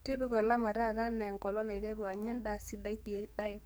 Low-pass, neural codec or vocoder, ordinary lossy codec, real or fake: none; codec, 44.1 kHz, 2.6 kbps, SNAC; none; fake